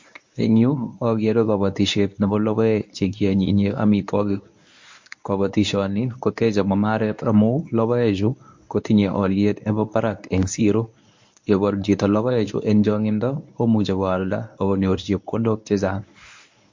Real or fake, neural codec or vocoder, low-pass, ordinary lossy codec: fake; codec, 24 kHz, 0.9 kbps, WavTokenizer, medium speech release version 1; 7.2 kHz; MP3, 48 kbps